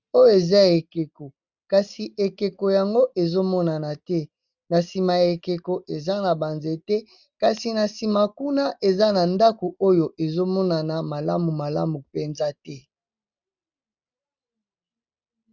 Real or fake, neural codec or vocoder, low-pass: real; none; 7.2 kHz